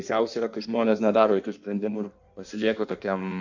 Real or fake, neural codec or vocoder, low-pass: fake; codec, 16 kHz in and 24 kHz out, 1.1 kbps, FireRedTTS-2 codec; 7.2 kHz